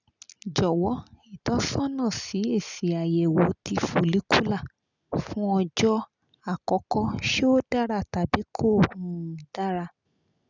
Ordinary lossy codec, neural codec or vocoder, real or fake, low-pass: none; none; real; 7.2 kHz